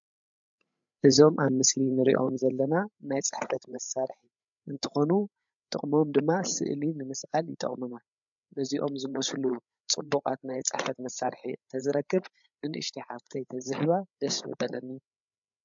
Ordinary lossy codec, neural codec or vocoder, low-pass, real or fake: MP3, 64 kbps; codec, 16 kHz, 8 kbps, FreqCodec, larger model; 7.2 kHz; fake